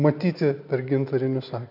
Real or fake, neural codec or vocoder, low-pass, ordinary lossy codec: real; none; 5.4 kHz; AAC, 48 kbps